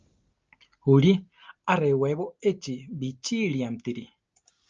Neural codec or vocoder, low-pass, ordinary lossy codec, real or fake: none; 7.2 kHz; Opus, 24 kbps; real